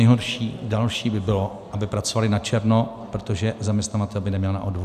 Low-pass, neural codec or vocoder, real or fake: 14.4 kHz; none; real